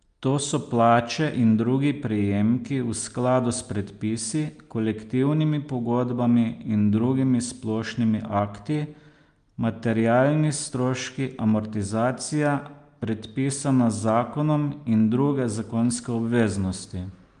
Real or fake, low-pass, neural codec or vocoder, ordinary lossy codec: real; 9.9 kHz; none; Opus, 32 kbps